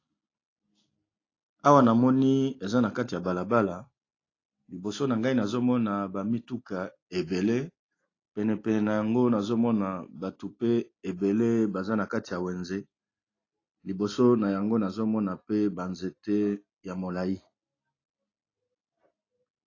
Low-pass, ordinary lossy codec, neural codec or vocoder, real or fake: 7.2 kHz; AAC, 32 kbps; none; real